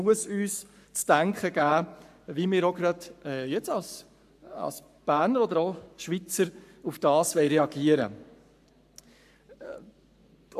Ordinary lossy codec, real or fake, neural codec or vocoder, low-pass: AAC, 96 kbps; fake; codec, 44.1 kHz, 7.8 kbps, Pupu-Codec; 14.4 kHz